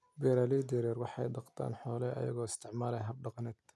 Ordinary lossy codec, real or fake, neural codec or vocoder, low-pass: none; real; none; none